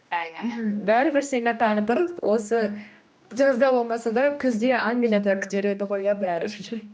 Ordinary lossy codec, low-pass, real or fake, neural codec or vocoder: none; none; fake; codec, 16 kHz, 1 kbps, X-Codec, HuBERT features, trained on general audio